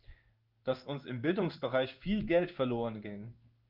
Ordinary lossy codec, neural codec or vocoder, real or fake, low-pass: Opus, 24 kbps; codec, 16 kHz in and 24 kHz out, 1 kbps, XY-Tokenizer; fake; 5.4 kHz